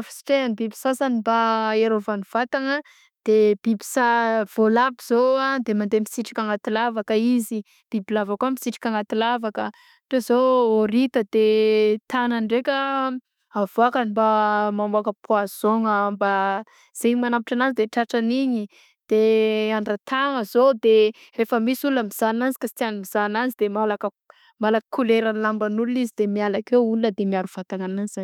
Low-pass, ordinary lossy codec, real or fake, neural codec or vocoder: 19.8 kHz; none; fake; autoencoder, 48 kHz, 32 numbers a frame, DAC-VAE, trained on Japanese speech